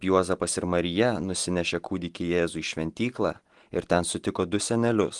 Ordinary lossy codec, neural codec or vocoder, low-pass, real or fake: Opus, 24 kbps; none; 10.8 kHz; real